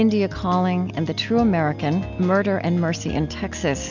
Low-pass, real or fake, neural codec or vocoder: 7.2 kHz; real; none